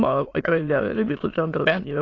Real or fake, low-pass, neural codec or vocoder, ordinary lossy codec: fake; 7.2 kHz; autoencoder, 22.05 kHz, a latent of 192 numbers a frame, VITS, trained on many speakers; AAC, 32 kbps